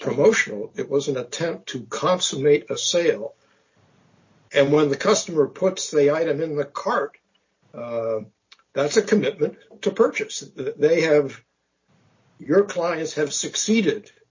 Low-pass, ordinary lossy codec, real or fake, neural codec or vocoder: 7.2 kHz; MP3, 32 kbps; real; none